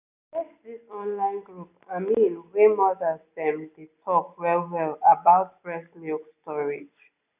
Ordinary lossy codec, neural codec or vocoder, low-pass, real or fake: none; none; 3.6 kHz; real